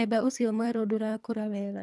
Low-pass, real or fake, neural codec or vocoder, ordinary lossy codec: none; fake; codec, 24 kHz, 3 kbps, HILCodec; none